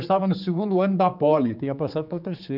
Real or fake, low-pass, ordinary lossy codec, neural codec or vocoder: fake; 5.4 kHz; none; codec, 16 kHz, 4 kbps, X-Codec, HuBERT features, trained on general audio